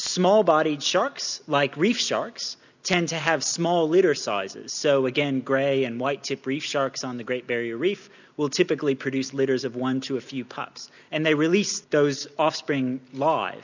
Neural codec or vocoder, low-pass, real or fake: none; 7.2 kHz; real